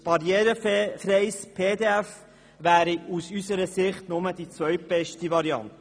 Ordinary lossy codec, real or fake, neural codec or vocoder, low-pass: none; real; none; none